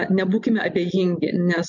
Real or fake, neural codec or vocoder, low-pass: real; none; 7.2 kHz